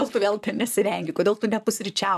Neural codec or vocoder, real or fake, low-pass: vocoder, 44.1 kHz, 128 mel bands, Pupu-Vocoder; fake; 14.4 kHz